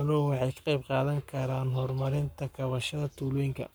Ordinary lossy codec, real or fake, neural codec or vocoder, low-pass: none; fake; codec, 44.1 kHz, 7.8 kbps, Pupu-Codec; none